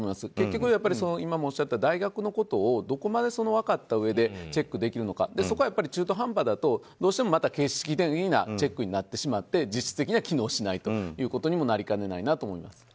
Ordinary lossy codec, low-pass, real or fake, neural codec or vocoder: none; none; real; none